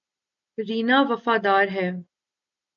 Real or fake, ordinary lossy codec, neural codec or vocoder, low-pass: real; AAC, 64 kbps; none; 7.2 kHz